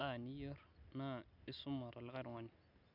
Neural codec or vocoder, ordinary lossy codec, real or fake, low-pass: none; none; real; 5.4 kHz